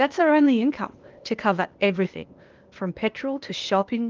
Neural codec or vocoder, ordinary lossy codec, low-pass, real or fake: codec, 16 kHz, 0.8 kbps, ZipCodec; Opus, 32 kbps; 7.2 kHz; fake